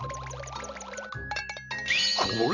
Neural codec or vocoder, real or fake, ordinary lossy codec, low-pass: none; real; none; 7.2 kHz